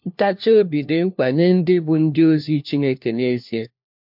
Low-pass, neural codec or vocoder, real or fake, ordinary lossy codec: 5.4 kHz; codec, 16 kHz, 1 kbps, FunCodec, trained on LibriTTS, 50 frames a second; fake; MP3, 48 kbps